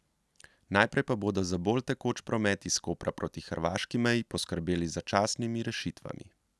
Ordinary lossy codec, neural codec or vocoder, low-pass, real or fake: none; none; none; real